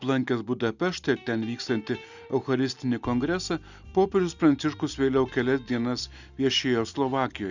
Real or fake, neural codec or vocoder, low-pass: real; none; 7.2 kHz